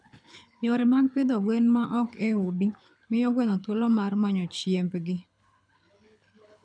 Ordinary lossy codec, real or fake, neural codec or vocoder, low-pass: none; fake; codec, 24 kHz, 6 kbps, HILCodec; 9.9 kHz